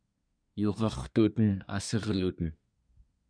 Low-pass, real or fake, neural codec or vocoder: 9.9 kHz; fake; codec, 24 kHz, 1 kbps, SNAC